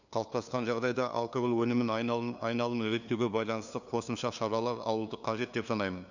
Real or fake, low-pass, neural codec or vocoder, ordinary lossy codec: fake; 7.2 kHz; codec, 16 kHz, 2 kbps, FunCodec, trained on LibriTTS, 25 frames a second; none